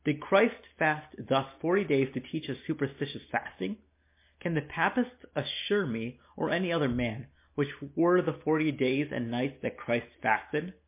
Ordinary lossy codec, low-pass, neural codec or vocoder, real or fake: MP3, 24 kbps; 3.6 kHz; none; real